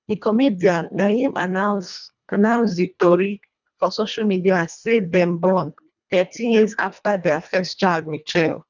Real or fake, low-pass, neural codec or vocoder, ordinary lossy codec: fake; 7.2 kHz; codec, 24 kHz, 1.5 kbps, HILCodec; none